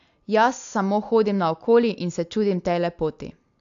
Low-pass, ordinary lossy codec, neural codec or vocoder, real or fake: 7.2 kHz; AAC, 64 kbps; none; real